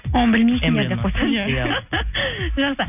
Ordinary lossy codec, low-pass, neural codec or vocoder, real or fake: none; 3.6 kHz; none; real